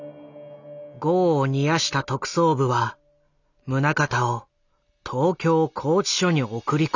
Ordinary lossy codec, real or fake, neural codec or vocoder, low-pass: none; real; none; 7.2 kHz